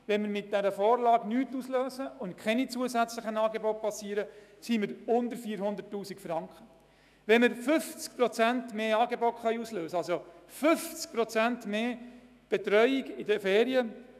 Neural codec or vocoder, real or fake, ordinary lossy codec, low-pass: autoencoder, 48 kHz, 128 numbers a frame, DAC-VAE, trained on Japanese speech; fake; none; 14.4 kHz